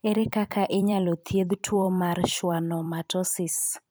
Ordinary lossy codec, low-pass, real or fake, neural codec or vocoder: none; none; fake; vocoder, 44.1 kHz, 128 mel bands every 256 samples, BigVGAN v2